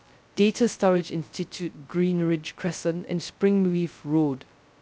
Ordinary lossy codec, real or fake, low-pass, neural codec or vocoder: none; fake; none; codec, 16 kHz, 0.2 kbps, FocalCodec